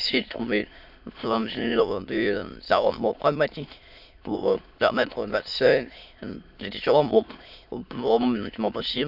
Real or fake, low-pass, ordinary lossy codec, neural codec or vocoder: fake; 5.4 kHz; none; autoencoder, 22.05 kHz, a latent of 192 numbers a frame, VITS, trained on many speakers